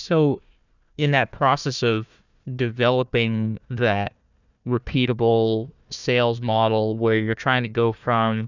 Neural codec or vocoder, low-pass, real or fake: codec, 16 kHz, 1 kbps, FunCodec, trained on Chinese and English, 50 frames a second; 7.2 kHz; fake